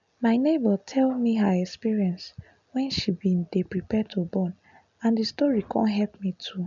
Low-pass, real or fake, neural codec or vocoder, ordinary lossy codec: 7.2 kHz; real; none; none